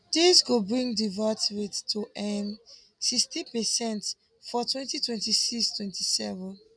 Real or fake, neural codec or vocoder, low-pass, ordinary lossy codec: real; none; 9.9 kHz; none